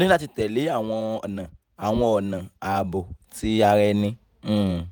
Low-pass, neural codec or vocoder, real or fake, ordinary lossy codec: none; vocoder, 48 kHz, 128 mel bands, Vocos; fake; none